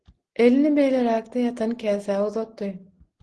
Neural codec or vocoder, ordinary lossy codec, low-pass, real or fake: none; Opus, 16 kbps; 10.8 kHz; real